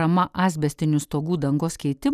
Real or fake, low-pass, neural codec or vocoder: real; 14.4 kHz; none